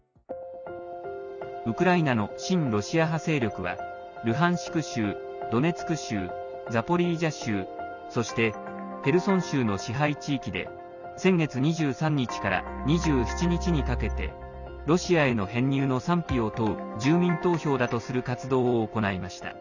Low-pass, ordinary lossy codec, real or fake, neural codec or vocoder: 7.2 kHz; none; real; none